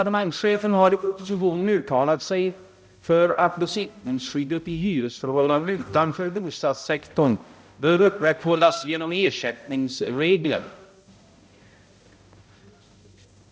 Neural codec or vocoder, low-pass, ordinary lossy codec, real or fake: codec, 16 kHz, 0.5 kbps, X-Codec, HuBERT features, trained on balanced general audio; none; none; fake